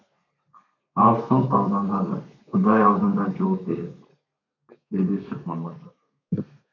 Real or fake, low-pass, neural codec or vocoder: fake; 7.2 kHz; codec, 32 kHz, 1.9 kbps, SNAC